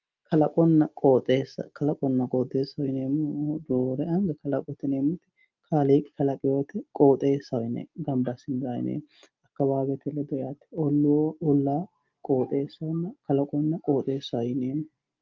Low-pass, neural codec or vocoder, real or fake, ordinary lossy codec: 7.2 kHz; none; real; Opus, 24 kbps